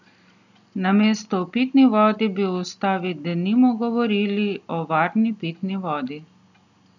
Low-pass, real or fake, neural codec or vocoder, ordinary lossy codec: 7.2 kHz; real; none; none